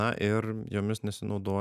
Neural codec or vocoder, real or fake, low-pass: none; real; 14.4 kHz